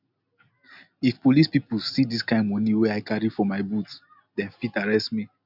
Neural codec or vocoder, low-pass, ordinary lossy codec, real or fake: none; 5.4 kHz; none; real